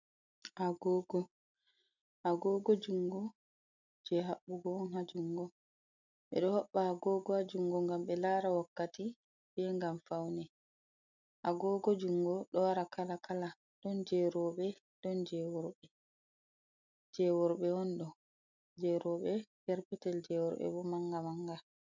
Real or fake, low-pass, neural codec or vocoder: real; 7.2 kHz; none